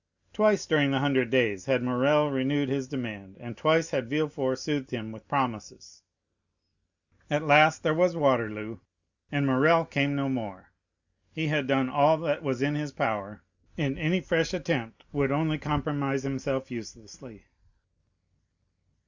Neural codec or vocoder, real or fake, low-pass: none; real; 7.2 kHz